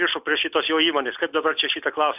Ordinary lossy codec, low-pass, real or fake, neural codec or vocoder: AAC, 32 kbps; 3.6 kHz; real; none